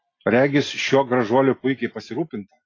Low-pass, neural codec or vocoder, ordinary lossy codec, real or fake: 7.2 kHz; none; AAC, 32 kbps; real